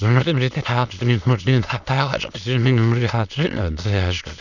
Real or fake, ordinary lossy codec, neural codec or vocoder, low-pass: fake; none; autoencoder, 22.05 kHz, a latent of 192 numbers a frame, VITS, trained on many speakers; 7.2 kHz